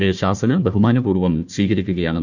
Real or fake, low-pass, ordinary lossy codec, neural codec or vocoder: fake; 7.2 kHz; none; codec, 16 kHz, 1 kbps, FunCodec, trained on Chinese and English, 50 frames a second